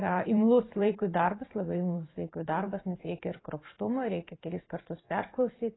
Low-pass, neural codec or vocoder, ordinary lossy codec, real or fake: 7.2 kHz; vocoder, 44.1 kHz, 128 mel bands every 512 samples, BigVGAN v2; AAC, 16 kbps; fake